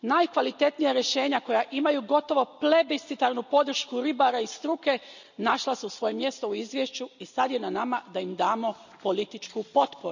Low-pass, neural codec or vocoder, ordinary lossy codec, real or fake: 7.2 kHz; none; none; real